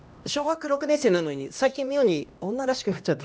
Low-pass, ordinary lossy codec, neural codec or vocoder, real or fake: none; none; codec, 16 kHz, 1 kbps, X-Codec, HuBERT features, trained on LibriSpeech; fake